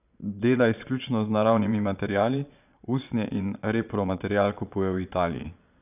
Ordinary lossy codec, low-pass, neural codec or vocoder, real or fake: none; 3.6 kHz; vocoder, 22.05 kHz, 80 mel bands, Vocos; fake